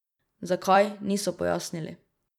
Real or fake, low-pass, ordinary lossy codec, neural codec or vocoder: fake; 19.8 kHz; none; vocoder, 44.1 kHz, 128 mel bands every 256 samples, BigVGAN v2